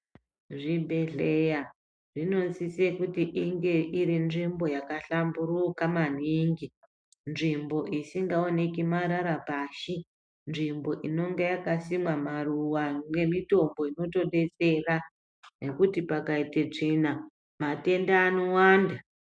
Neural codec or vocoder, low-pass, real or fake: none; 9.9 kHz; real